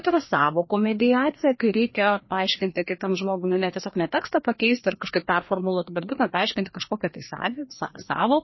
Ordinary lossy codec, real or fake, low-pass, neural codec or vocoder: MP3, 24 kbps; fake; 7.2 kHz; codec, 16 kHz, 2 kbps, FreqCodec, larger model